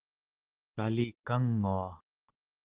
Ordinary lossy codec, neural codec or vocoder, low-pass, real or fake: Opus, 16 kbps; none; 3.6 kHz; real